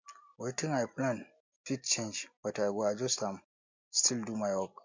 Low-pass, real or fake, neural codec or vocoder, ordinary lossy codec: 7.2 kHz; real; none; MP3, 48 kbps